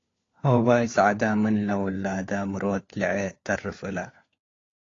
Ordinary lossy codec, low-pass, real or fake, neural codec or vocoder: AAC, 32 kbps; 7.2 kHz; fake; codec, 16 kHz, 4 kbps, FunCodec, trained on LibriTTS, 50 frames a second